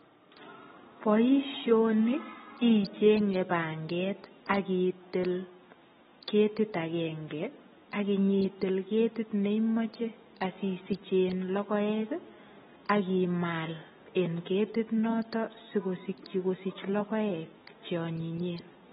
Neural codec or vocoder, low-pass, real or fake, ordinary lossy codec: none; 19.8 kHz; real; AAC, 16 kbps